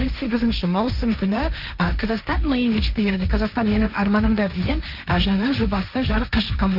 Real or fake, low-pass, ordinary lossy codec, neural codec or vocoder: fake; 5.4 kHz; none; codec, 16 kHz, 1.1 kbps, Voila-Tokenizer